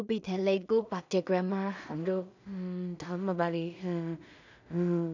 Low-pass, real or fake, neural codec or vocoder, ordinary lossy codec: 7.2 kHz; fake; codec, 16 kHz in and 24 kHz out, 0.4 kbps, LongCat-Audio-Codec, two codebook decoder; none